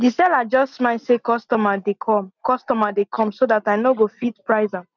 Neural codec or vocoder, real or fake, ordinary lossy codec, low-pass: none; real; none; 7.2 kHz